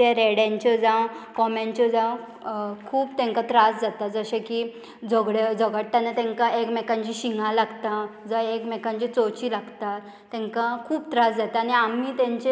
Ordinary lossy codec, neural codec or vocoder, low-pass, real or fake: none; none; none; real